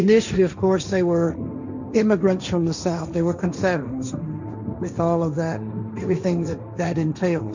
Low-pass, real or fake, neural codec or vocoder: 7.2 kHz; fake; codec, 16 kHz, 1.1 kbps, Voila-Tokenizer